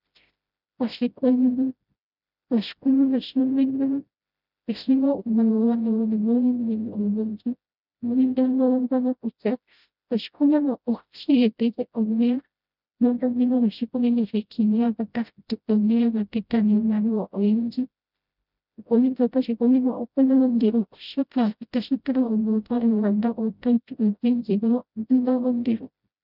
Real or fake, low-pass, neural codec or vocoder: fake; 5.4 kHz; codec, 16 kHz, 0.5 kbps, FreqCodec, smaller model